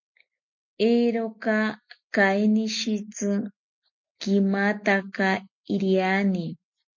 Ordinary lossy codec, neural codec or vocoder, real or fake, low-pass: MP3, 48 kbps; none; real; 7.2 kHz